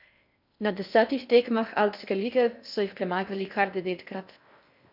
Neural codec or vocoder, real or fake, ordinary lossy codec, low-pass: codec, 16 kHz in and 24 kHz out, 0.6 kbps, FocalCodec, streaming, 4096 codes; fake; none; 5.4 kHz